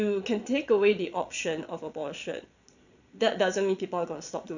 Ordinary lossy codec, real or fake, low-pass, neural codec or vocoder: none; fake; 7.2 kHz; vocoder, 22.05 kHz, 80 mel bands, Vocos